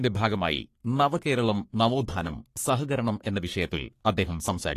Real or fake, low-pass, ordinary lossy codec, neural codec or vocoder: fake; 14.4 kHz; AAC, 48 kbps; codec, 44.1 kHz, 3.4 kbps, Pupu-Codec